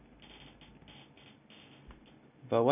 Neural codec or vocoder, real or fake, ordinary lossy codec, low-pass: none; real; none; 3.6 kHz